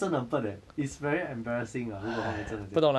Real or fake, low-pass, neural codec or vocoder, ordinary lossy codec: real; none; none; none